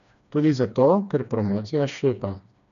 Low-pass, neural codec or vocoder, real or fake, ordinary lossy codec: 7.2 kHz; codec, 16 kHz, 2 kbps, FreqCodec, smaller model; fake; none